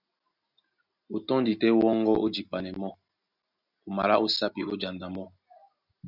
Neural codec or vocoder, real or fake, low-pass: none; real; 5.4 kHz